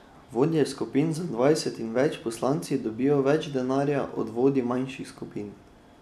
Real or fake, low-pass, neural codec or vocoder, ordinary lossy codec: real; 14.4 kHz; none; none